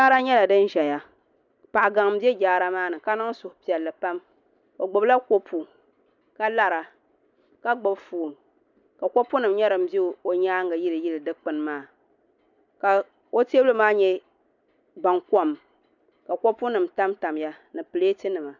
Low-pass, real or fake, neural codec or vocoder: 7.2 kHz; real; none